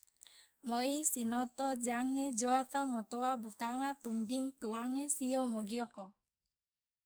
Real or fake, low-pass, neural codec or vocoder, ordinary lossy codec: fake; none; codec, 44.1 kHz, 2.6 kbps, SNAC; none